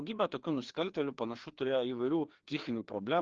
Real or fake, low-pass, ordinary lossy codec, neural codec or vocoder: fake; 7.2 kHz; Opus, 16 kbps; codec, 16 kHz, 2 kbps, FreqCodec, larger model